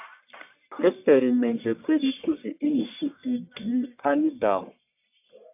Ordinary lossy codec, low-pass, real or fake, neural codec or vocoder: AAC, 32 kbps; 3.6 kHz; fake; codec, 44.1 kHz, 1.7 kbps, Pupu-Codec